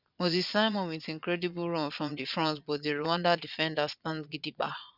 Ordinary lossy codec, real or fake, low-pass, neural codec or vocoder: none; fake; 5.4 kHz; vocoder, 22.05 kHz, 80 mel bands, Vocos